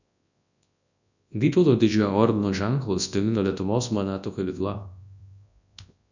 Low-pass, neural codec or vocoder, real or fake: 7.2 kHz; codec, 24 kHz, 0.9 kbps, WavTokenizer, large speech release; fake